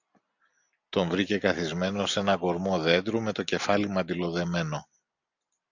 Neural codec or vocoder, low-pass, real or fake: none; 7.2 kHz; real